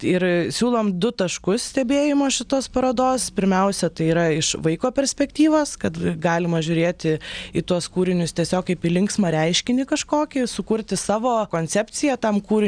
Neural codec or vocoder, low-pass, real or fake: none; 9.9 kHz; real